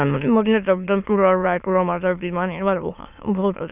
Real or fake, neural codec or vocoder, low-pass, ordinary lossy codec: fake; autoencoder, 22.05 kHz, a latent of 192 numbers a frame, VITS, trained on many speakers; 3.6 kHz; none